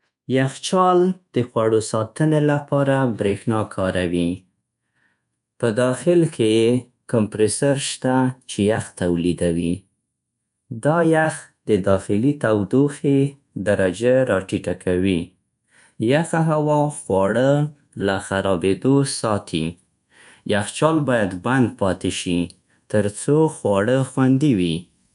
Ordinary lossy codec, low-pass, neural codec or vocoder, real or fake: none; 10.8 kHz; codec, 24 kHz, 1.2 kbps, DualCodec; fake